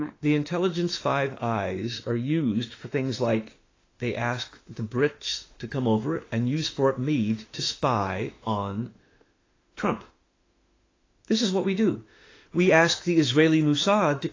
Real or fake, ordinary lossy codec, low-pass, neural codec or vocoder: fake; AAC, 32 kbps; 7.2 kHz; autoencoder, 48 kHz, 32 numbers a frame, DAC-VAE, trained on Japanese speech